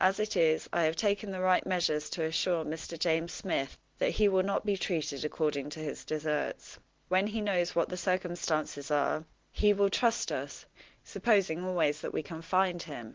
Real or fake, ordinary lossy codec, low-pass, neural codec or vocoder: real; Opus, 16 kbps; 7.2 kHz; none